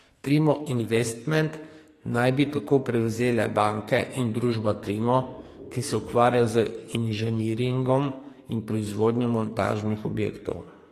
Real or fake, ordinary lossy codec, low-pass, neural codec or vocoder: fake; AAC, 48 kbps; 14.4 kHz; codec, 44.1 kHz, 2.6 kbps, SNAC